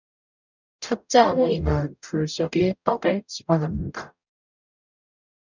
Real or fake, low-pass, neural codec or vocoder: fake; 7.2 kHz; codec, 44.1 kHz, 0.9 kbps, DAC